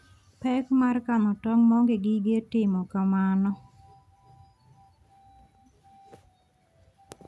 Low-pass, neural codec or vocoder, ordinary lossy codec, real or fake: none; none; none; real